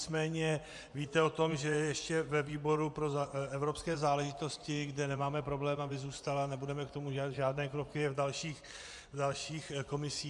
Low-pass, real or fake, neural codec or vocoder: 10.8 kHz; fake; vocoder, 24 kHz, 100 mel bands, Vocos